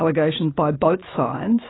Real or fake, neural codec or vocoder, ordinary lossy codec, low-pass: real; none; AAC, 16 kbps; 7.2 kHz